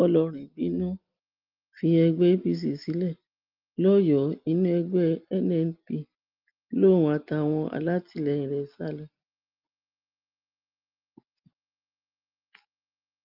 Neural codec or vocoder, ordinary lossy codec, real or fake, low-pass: none; Opus, 32 kbps; real; 5.4 kHz